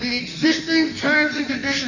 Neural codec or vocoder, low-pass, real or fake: codec, 16 kHz in and 24 kHz out, 1.1 kbps, FireRedTTS-2 codec; 7.2 kHz; fake